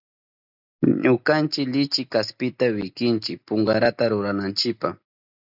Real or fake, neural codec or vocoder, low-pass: real; none; 5.4 kHz